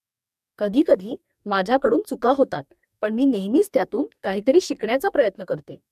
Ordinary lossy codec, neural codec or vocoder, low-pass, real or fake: MP3, 96 kbps; codec, 44.1 kHz, 2.6 kbps, DAC; 14.4 kHz; fake